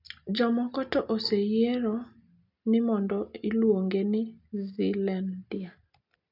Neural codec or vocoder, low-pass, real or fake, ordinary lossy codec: none; 5.4 kHz; real; none